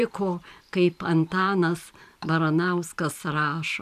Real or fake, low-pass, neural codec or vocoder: fake; 14.4 kHz; vocoder, 44.1 kHz, 128 mel bands every 512 samples, BigVGAN v2